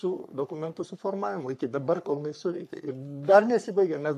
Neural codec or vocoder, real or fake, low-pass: codec, 44.1 kHz, 3.4 kbps, Pupu-Codec; fake; 14.4 kHz